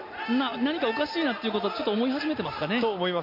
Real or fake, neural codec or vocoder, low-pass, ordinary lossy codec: real; none; 5.4 kHz; MP3, 32 kbps